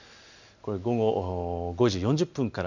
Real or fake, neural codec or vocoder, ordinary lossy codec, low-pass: real; none; none; 7.2 kHz